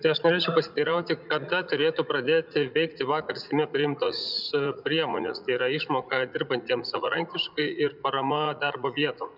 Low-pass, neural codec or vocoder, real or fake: 5.4 kHz; vocoder, 44.1 kHz, 80 mel bands, Vocos; fake